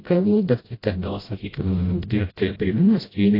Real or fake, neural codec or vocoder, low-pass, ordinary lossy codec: fake; codec, 16 kHz, 0.5 kbps, FreqCodec, smaller model; 5.4 kHz; AAC, 24 kbps